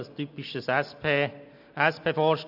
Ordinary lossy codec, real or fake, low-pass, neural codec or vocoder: none; real; 5.4 kHz; none